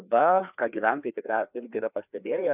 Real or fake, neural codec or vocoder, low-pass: fake; codec, 16 kHz, 2 kbps, FreqCodec, larger model; 3.6 kHz